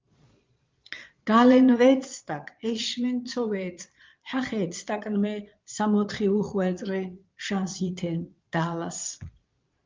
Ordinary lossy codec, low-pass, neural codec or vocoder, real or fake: Opus, 32 kbps; 7.2 kHz; vocoder, 22.05 kHz, 80 mel bands, Vocos; fake